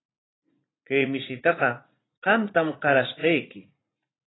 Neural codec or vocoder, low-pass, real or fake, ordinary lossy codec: vocoder, 44.1 kHz, 80 mel bands, Vocos; 7.2 kHz; fake; AAC, 16 kbps